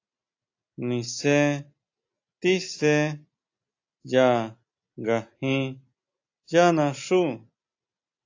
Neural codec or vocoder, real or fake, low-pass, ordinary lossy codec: none; real; 7.2 kHz; AAC, 48 kbps